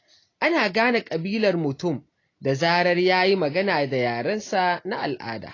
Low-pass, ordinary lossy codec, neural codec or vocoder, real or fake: 7.2 kHz; AAC, 32 kbps; none; real